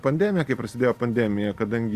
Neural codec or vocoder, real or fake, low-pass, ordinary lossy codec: none; real; 14.4 kHz; Opus, 64 kbps